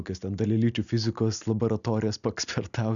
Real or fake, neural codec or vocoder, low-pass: real; none; 7.2 kHz